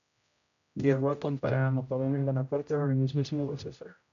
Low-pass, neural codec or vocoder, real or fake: 7.2 kHz; codec, 16 kHz, 0.5 kbps, X-Codec, HuBERT features, trained on general audio; fake